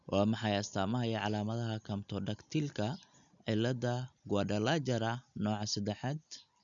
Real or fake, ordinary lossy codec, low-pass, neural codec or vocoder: real; none; 7.2 kHz; none